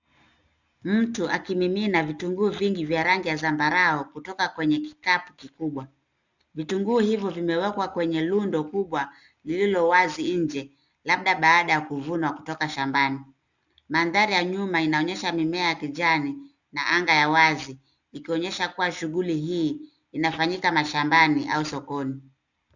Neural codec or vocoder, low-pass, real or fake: none; 7.2 kHz; real